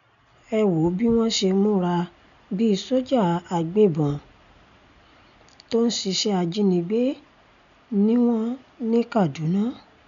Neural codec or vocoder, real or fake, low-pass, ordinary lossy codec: none; real; 7.2 kHz; none